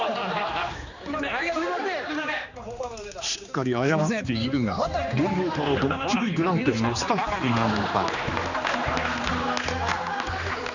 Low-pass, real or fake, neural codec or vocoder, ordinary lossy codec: 7.2 kHz; fake; codec, 16 kHz, 2 kbps, X-Codec, HuBERT features, trained on general audio; none